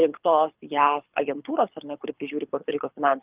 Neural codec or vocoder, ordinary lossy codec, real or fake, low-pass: codec, 24 kHz, 6 kbps, HILCodec; Opus, 32 kbps; fake; 3.6 kHz